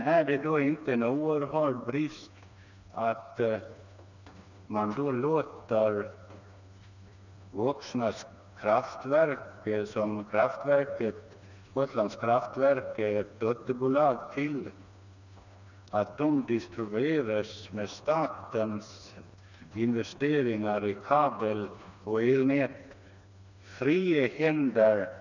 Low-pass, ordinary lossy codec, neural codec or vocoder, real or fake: 7.2 kHz; none; codec, 16 kHz, 2 kbps, FreqCodec, smaller model; fake